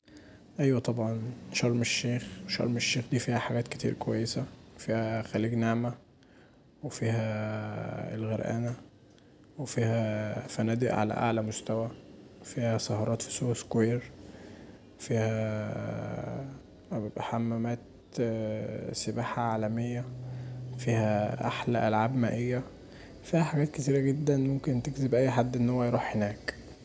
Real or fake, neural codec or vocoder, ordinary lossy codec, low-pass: real; none; none; none